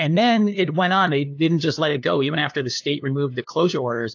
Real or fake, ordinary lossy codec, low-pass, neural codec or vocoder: fake; AAC, 48 kbps; 7.2 kHz; codec, 16 kHz, 2 kbps, FunCodec, trained on LibriTTS, 25 frames a second